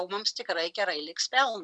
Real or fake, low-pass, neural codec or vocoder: real; 9.9 kHz; none